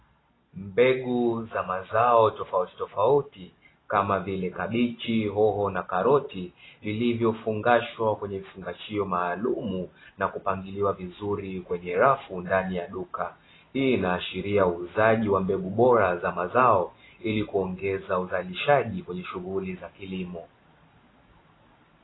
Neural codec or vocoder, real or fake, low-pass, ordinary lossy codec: none; real; 7.2 kHz; AAC, 16 kbps